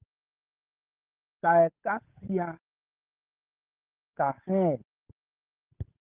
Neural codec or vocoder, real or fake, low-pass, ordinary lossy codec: codec, 16 kHz, 8 kbps, FunCodec, trained on Chinese and English, 25 frames a second; fake; 3.6 kHz; Opus, 32 kbps